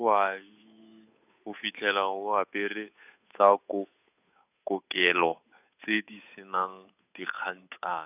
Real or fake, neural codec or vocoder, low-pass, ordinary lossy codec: fake; codec, 16 kHz, 8 kbps, FunCodec, trained on Chinese and English, 25 frames a second; 3.6 kHz; none